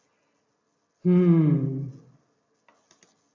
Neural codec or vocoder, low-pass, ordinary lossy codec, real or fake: none; 7.2 kHz; MP3, 64 kbps; real